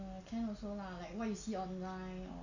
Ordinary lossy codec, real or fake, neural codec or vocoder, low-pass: none; real; none; 7.2 kHz